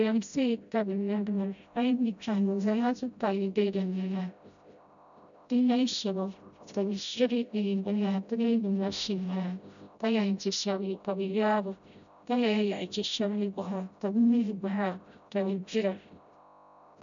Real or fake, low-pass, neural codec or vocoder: fake; 7.2 kHz; codec, 16 kHz, 0.5 kbps, FreqCodec, smaller model